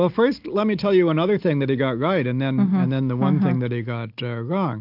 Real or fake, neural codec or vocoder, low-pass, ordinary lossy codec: real; none; 5.4 kHz; AAC, 48 kbps